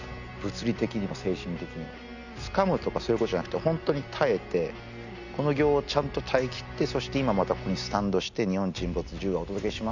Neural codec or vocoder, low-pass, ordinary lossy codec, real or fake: none; 7.2 kHz; none; real